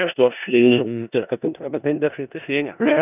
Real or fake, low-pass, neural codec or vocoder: fake; 3.6 kHz; codec, 16 kHz in and 24 kHz out, 0.4 kbps, LongCat-Audio-Codec, four codebook decoder